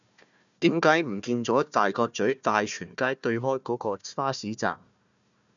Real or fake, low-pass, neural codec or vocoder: fake; 7.2 kHz; codec, 16 kHz, 1 kbps, FunCodec, trained on Chinese and English, 50 frames a second